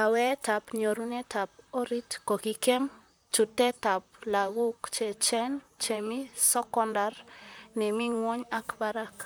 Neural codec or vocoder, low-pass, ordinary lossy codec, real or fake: vocoder, 44.1 kHz, 128 mel bands, Pupu-Vocoder; none; none; fake